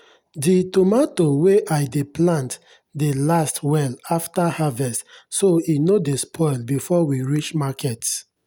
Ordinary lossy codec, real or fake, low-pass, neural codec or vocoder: none; real; none; none